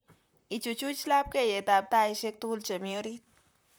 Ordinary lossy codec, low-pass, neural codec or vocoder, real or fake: none; none; vocoder, 44.1 kHz, 128 mel bands, Pupu-Vocoder; fake